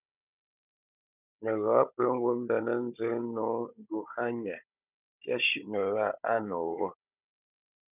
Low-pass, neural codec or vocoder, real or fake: 3.6 kHz; codec, 16 kHz, 16 kbps, FunCodec, trained on Chinese and English, 50 frames a second; fake